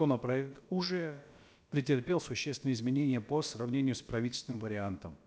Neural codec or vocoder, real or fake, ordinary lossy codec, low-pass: codec, 16 kHz, about 1 kbps, DyCAST, with the encoder's durations; fake; none; none